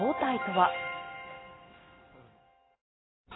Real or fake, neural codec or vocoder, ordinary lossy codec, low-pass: real; none; AAC, 16 kbps; 7.2 kHz